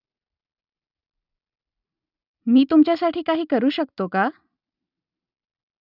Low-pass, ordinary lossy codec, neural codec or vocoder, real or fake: 5.4 kHz; none; none; real